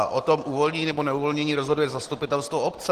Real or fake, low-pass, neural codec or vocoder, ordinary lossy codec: real; 14.4 kHz; none; Opus, 16 kbps